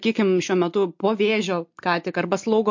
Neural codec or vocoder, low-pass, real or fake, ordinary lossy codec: none; 7.2 kHz; real; MP3, 48 kbps